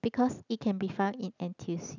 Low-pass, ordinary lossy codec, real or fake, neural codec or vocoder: 7.2 kHz; none; real; none